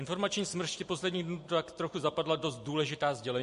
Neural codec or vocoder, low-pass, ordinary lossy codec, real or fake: none; 10.8 kHz; MP3, 48 kbps; real